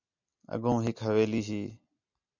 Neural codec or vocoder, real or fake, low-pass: none; real; 7.2 kHz